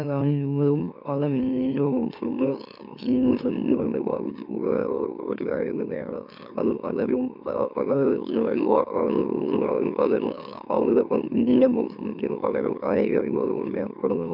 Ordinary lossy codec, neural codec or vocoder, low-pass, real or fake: none; autoencoder, 44.1 kHz, a latent of 192 numbers a frame, MeloTTS; 5.4 kHz; fake